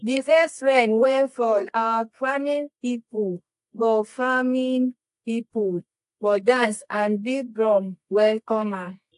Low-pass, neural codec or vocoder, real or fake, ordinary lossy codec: 10.8 kHz; codec, 24 kHz, 0.9 kbps, WavTokenizer, medium music audio release; fake; AAC, 64 kbps